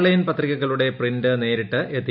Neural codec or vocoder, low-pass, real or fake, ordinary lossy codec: none; 5.4 kHz; real; none